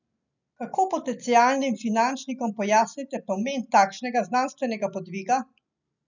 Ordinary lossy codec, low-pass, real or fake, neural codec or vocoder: none; 7.2 kHz; real; none